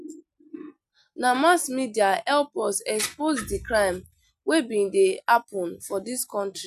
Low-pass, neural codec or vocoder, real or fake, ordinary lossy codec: 14.4 kHz; none; real; none